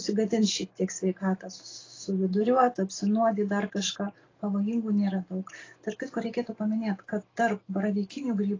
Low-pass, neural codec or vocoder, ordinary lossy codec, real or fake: 7.2 kHz; none; AAC, 32 kbps; real